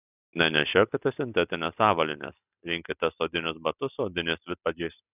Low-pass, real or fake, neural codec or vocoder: 3.6 kHz; real; none